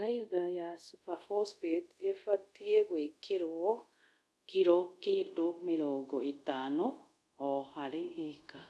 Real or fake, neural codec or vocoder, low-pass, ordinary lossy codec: fake; codec, 24 kHz, 0.5 kbps, DualCodec; none; none